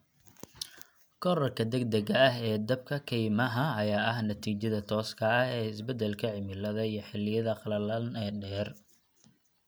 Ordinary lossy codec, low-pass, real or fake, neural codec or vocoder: none; none; real; none